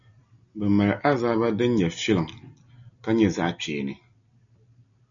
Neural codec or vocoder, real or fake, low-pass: none; real; 7.2 kHz